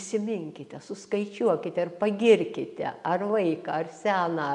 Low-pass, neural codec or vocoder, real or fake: 10.8 kHz; none; real